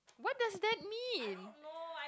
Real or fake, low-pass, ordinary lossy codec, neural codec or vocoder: real; none; none; none